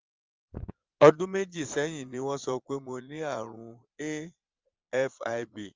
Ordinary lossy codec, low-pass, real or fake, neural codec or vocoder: Opus, 32 kbps; 7.2 kHz; fake; vocoder, 22.05 kHz, 80 mel bands, Vocos